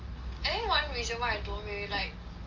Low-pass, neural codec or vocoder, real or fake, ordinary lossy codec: 7.2 kHz; none; real; Opus, 32 kbps